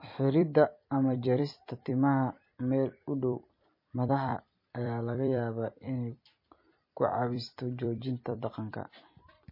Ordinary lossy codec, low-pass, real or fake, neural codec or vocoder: MP3, 24 kbps; 5.4 kHz; fake; vocoder, 44.1 kHz, 128 mel bands every 256 samples, BigVGAN v2